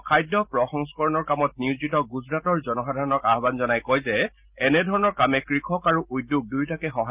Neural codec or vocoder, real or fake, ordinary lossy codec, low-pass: none; real; Opus, 32 kbps; 3.6 kHz